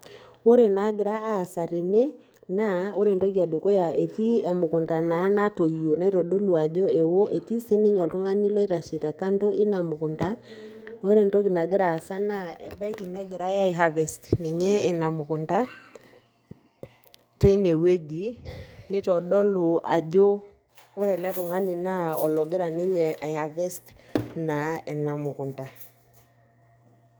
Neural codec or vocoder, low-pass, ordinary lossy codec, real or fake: codec, 44.1 kHz, 2.6 kbps, SNAC; none; none; fake